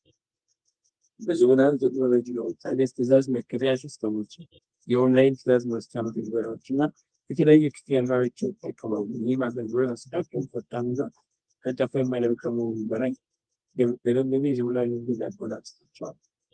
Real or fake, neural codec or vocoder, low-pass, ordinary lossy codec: fake; codec, 24 kHz, 0.9 kbps, WavTokenizer, medium music audio release; 9.9 kHz; Opus, 24 kbps